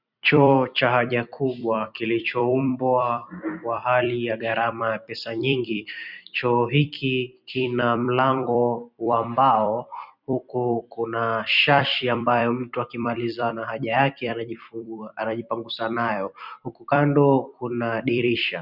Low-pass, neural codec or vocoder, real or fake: 5.4 kHz; vocoder, 44.1 kHz, 128 mel bands every 256 samples, BigVGAN v2; fake